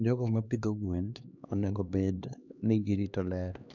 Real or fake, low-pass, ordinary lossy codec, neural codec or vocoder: fake; 7.2 kHz; none; codec, 16 kHz, 2 kbps, X-Codec, HuBERT features, trained on LibriSpeech